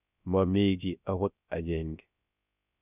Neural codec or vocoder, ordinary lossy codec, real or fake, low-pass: codec, 16 kHz, 0.3 kbps, FocalCodec; none; fake; 3.6 kHz